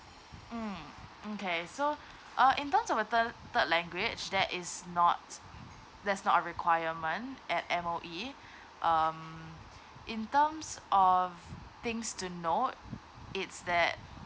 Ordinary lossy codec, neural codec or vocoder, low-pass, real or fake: none; none; none; real